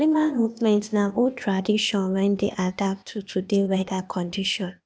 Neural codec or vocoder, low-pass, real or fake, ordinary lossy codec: codec, 16 kHz, 0.8 kbps, ZipCodec; none; fake; none